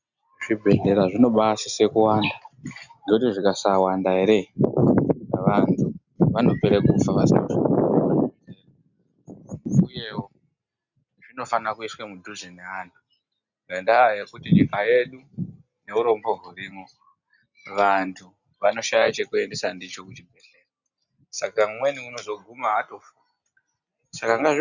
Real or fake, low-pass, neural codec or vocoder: real; 7.2 kHz; none